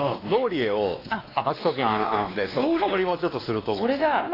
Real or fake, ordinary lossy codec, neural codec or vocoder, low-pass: fake; AAC, 24 kbps; codec, 16 kHz, 2 kbps, X-Codec, WavLM features, trained on Multilingual LibriSpeech; 5.4 kHz